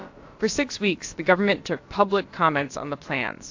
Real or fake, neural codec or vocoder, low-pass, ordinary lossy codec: fake; codec, 16 kHz, about 1 kbps, DyCAST, with the encoder's durations; 7.2 kHz; AAC, 48 kbps